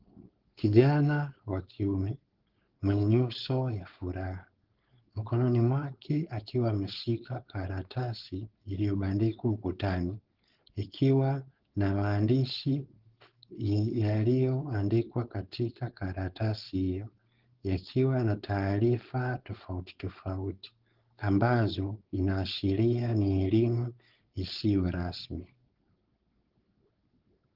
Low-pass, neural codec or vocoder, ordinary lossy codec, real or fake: 5.4 kHz; codec, 16 kHz, 4.8 kbps, FACodec; Opus, 16 kbps; fake